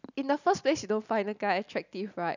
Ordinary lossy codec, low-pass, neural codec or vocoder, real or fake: none; 7.2 kHz; none; real